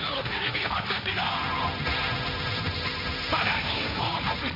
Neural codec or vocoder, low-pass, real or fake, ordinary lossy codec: codec, 16 kHz, 1.1 kbps, Voila-Tokenizer; 5.4 kHz; fake; MP3, 48 kbps